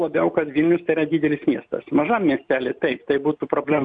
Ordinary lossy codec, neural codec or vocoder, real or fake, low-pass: MP3, 64 kbps; none; real; 9.9 kHz